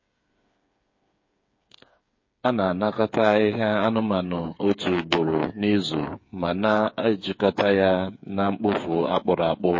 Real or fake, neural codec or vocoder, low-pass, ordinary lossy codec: fake; codec, 16 kHz, 8 kbps, FreqCodec, smaller model; 7.2 kHz; MP3, 32 kbps